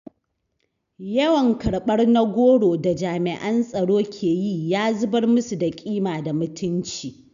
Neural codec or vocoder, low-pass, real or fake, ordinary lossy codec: none; 7.2 kHz; real; none